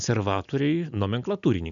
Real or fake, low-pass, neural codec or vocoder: real; 7.2 kHz; none